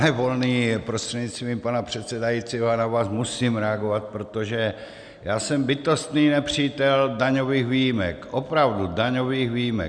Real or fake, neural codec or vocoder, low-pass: real; none; 9.9 kHz